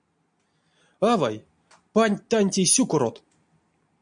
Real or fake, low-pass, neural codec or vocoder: real; 9.9 kHz; none